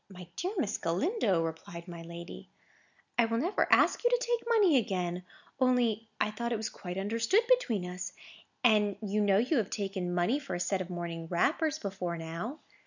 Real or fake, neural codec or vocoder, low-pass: real; none; 7.2 kHz